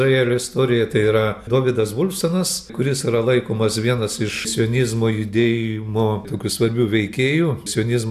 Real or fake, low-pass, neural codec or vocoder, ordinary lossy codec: real; 14.4 kHz; none; AAC, 96 kbps